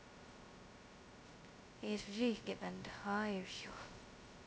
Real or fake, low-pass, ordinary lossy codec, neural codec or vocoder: fake; none; none; codec, 16 kHz, 0.2 kbps, FocalCodec